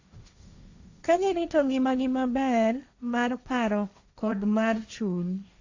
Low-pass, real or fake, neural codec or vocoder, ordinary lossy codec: 7.2 kHz; fake; codec, 16 kHz, 1.1 kbps, Voila-Tokenizer; AAC, 48 kbps